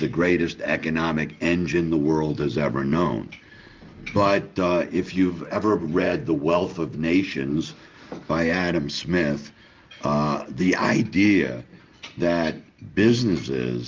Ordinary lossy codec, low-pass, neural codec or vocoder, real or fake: Opus, 24 kbps; 7.2 kHz; none; real